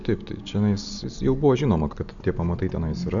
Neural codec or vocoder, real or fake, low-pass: none; real; 7.2 kHz